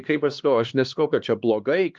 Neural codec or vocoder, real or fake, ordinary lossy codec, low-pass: codec, 16 kHz, 2 kbps, X-Codec, HuBERT features, trained on LibriSpeech; fake; Opus, 32 kbps; 7.2 kHz